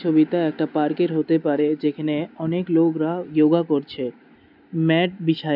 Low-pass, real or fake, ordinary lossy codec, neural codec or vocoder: 5.4 kHz; real; none; none